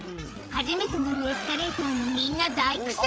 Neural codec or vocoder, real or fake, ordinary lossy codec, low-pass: codec, 16 kHz, 8 kbps, FreqCodec, larger model; fake; none; none